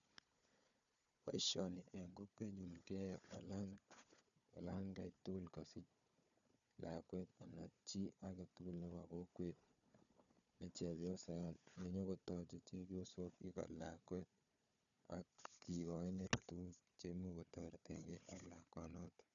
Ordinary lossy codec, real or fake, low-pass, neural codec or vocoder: none; fake; 7.2 kHz; codec, 16 kHz, 4 kbps, FunCodec, trained on Chinese and English, 50 frames a second